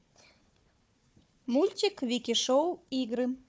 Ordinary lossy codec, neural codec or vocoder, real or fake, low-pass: none; codec, 16 kHz, 4 kbps, FunCodec, trained on Chinese and English, 50 frames a second; fake; none